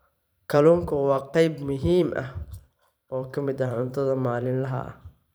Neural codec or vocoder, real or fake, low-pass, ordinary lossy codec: vocoder, 44.1 kHz, 128 mel bands every 512 samples, BigVGAN v2; fake; none; none